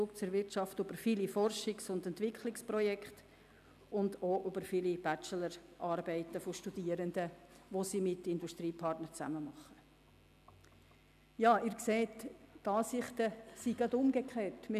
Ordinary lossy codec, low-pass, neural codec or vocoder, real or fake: none; 14.4 kHz; none; real